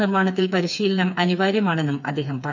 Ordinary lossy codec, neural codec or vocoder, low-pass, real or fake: none; codec, 16 kHz, 4 kbps, FreqCodec, smaller model; 7.2 kHz; fake